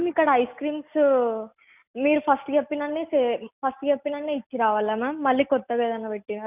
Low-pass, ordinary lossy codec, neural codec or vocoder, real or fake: 3.6 kHz; none; none; real